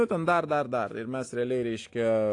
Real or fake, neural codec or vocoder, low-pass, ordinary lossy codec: real; none; 10.8 kHz; AAC, 48 kbps